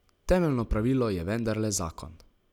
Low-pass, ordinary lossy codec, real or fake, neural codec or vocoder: 19.8 kHz; none; real; none